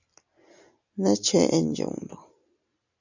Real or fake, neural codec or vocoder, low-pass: real; none; 7.2 kHz